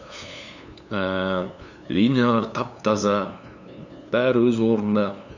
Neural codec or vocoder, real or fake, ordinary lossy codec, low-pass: codec, 16 kHz, 2 kbps, FunCodec, trained on LibriTTS, 25 frames a second; fake; none; 7.2 kHz